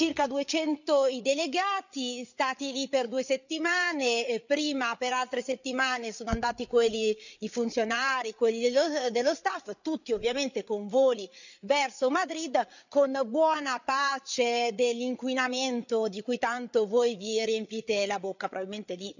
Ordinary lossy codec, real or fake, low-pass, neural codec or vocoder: none; fake; 7.2 kHz; codec, 16 kHz, 8 kbps, FreqCodec, larger model